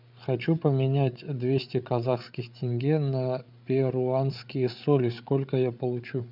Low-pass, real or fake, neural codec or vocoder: 5.4 kHz; fake; codec, 16 kHz, 8 kbps, FreqCodec, larger model